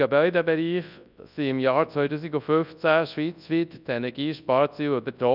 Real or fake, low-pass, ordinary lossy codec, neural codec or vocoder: fake; 5.4 kHz; none; codec, 24 kHz, 0.9 kbps, WavTokenizer, large speech release